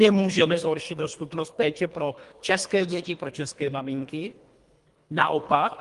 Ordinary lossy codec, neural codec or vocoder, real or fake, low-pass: Opus, 32 kbps; codec, 24 kHz, 1.5 kbps, HILCodec; fake; 10.8 kHz